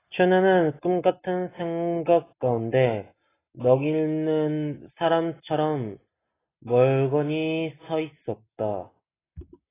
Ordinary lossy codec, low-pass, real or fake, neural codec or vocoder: AAC, 16 kbps; 3.6 kHz; real; none